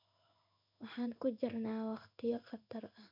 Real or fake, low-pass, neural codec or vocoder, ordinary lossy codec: fake; 5.4 kHz; codec, 16 kHz in and 24 kHz out, 1 kbps, XY-Tokenizer; none